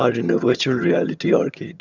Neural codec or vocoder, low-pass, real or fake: vocoder, 22.05 kHz, 80 mel bands, HiFi-GAN; 7.2 kHz; fake